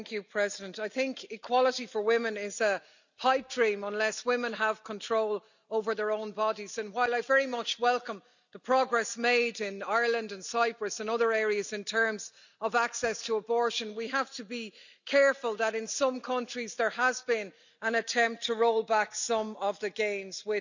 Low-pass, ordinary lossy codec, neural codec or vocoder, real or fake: 7.2 kHz; none; none; real